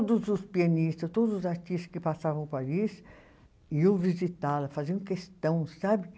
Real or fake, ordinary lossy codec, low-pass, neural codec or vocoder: real; none; none; none